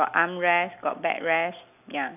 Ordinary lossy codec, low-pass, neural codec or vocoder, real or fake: none; 3.6 kHz; none; real